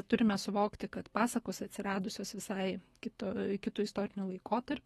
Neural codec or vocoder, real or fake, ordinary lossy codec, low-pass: autoencoder, 48 kHz, 128 numbers a frame, DAC-VAE, trained on Japanese speech; fake; AAC, 32 kbps; 19.8 kHz